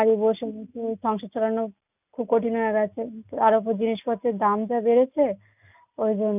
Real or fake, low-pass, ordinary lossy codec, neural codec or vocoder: real; 3.6 kHz; none; none